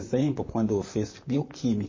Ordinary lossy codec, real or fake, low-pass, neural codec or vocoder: MP3, 32 kbps; fake; 7.2 kHz; vocoder, 44.1 kHz, 128 mel bands, Pupu-Vocoder